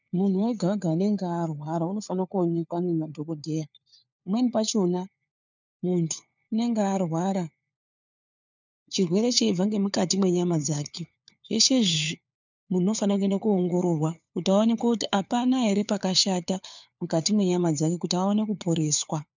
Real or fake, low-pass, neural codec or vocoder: fake; 7.2 kHz; codec, 16 kHz, 4 kbps, FunCodec, trained on LibriTTS, 50 frames a second